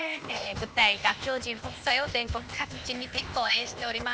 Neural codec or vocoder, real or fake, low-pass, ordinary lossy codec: codec, 16 kHz, 0.8 kbps, ZipCodec; fake; none; none